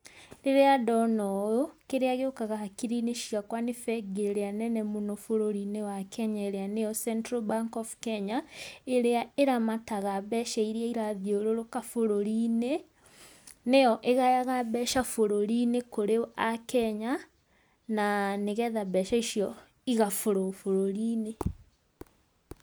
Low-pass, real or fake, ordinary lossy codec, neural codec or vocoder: none; real; none; none